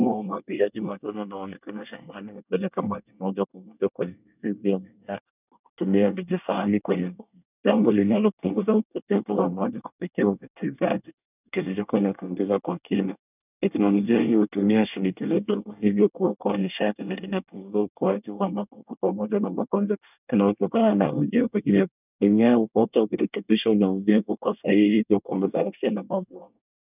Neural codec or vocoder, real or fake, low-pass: codec, 24 kHz, 1 kbps, SNAC; fake; 3.6 kHz